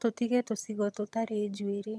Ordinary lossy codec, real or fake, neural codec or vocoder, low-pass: none; fake; vocoder, 22.05 kHz, 80 mel bands, HiFi-GAN; none